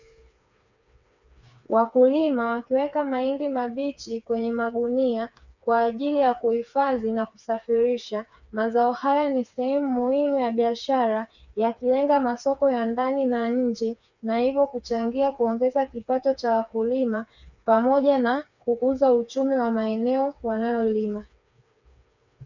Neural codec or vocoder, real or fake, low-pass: codec, 16 kHz, 4 kbps, FreqCodec, smaller model; fake; 7.2 kHz